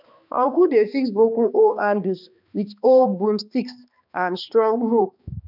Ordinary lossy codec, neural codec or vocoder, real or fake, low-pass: none; codec, 16 kHz, 2 kbps, X-Codec, HuBERT features, trained on balanced general audio; fake; 5.4 kHz